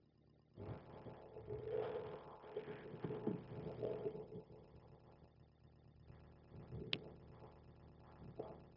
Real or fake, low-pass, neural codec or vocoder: fake; 5.4 kHz; codec, 16 kHz, 0.4 kbps, LongCat-Audio-Codec